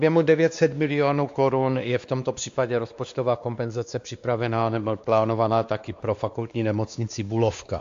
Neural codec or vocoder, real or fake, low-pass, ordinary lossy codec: codec, 16 kHz, 2 kbps, X-Codec, WavLM features, trained on Multilingual LibriSpeech; fake; 7.2 kHz; AAC, 64 kbps